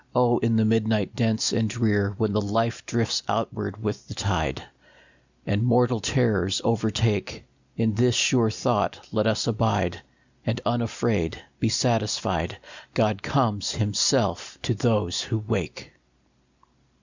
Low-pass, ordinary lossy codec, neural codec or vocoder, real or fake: 7.2 kHz; Opus, 64 kbps; none; real